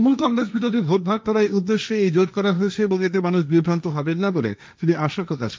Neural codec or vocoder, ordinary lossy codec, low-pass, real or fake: codec, 16 kHz, 1.1 kbps, Voila-Tokenizer; none; none; fake